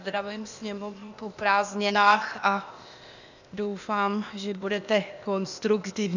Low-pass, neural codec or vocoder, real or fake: 7.2 kHz; codec, 16 kHz, 0.8 kbps, ZipCodec; fake